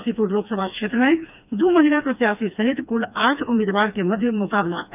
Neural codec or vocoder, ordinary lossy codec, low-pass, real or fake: codec, 16 kHz, 2 kbps, FreqCodec, smaller model; none; 3.6 kHz; fake